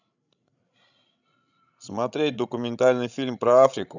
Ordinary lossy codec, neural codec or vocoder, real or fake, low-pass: none; codec, 16 kHz, 16 kbps, FreqCodec, larger model; fake; 7.2 kHz